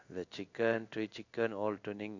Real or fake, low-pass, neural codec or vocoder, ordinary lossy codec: fake; 7.2 kHz; codec, 16 kHz in and 24 kHz out, 1 kbps, XY-Tokenizer; none